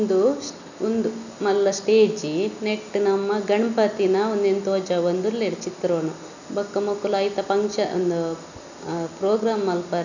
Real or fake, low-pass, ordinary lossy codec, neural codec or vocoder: real; 7.2 kHz; none; none